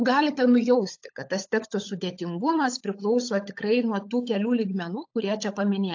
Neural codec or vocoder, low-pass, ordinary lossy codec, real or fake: codec, 16 kHz, 16 kbps, FunCodec, trained on LibriTTS, 50 frames a second; 7.2 kHz; AAC, 48 kbps; fake